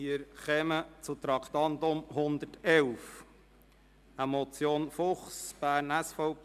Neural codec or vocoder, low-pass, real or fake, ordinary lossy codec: none; 14.4 kHz; real; MP3, 96 kbps